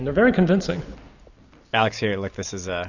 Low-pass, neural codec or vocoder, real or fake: 7.2 kHz; none; real